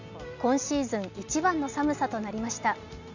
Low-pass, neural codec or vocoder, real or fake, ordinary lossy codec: 7.2 kHz; none; real; none